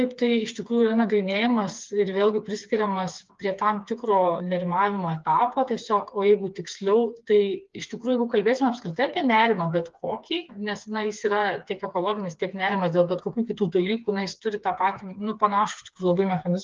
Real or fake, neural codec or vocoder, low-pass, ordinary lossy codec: fake; codec, 16 kHz, 4 kbps, FreqCodec, smaller model; 7.2 kHz; Opus, 32 kbps